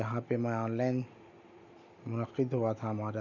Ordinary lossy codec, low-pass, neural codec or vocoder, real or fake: none; 7.2 kHz; none; real